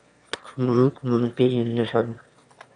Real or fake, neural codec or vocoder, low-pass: fake; autoencoder, 22.05 kHz, a latent of 192 numbers a frame, VITS, trained on one speaker; 9.9 kHz